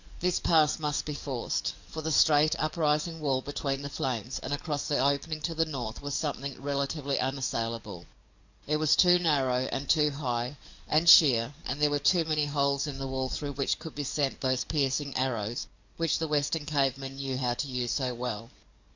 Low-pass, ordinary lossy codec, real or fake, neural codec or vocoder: 7.2 kHz; Opus, 64 kbps; fake; codec, 44.1 kHz, 7.8 kbps, DAC